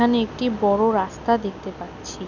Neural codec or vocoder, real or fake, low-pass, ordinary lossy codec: none; real; 7.2 kHz; none